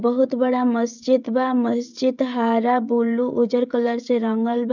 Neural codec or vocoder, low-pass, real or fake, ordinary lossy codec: codec, 16 kHz, 16 kbps, FreqCodec, smaller model; 7.2 kHz; fake; none